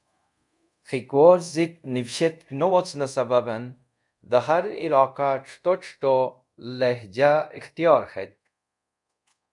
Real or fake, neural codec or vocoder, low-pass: fake; codec, 24 kHz, 0.5 kbps, DualCodec; 10.8 kHz